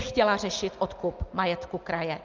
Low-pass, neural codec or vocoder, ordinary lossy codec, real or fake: 7.2 kHz; none; Opus, 24 kbps; real